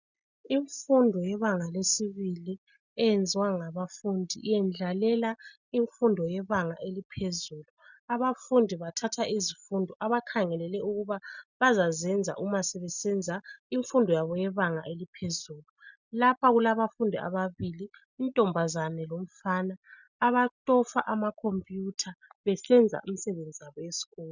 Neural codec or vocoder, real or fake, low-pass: none; real; 7.2 kHz